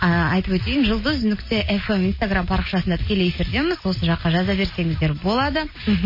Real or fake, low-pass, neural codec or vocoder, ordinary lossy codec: real; 5.4 kHz; none; MP3, 24 kbps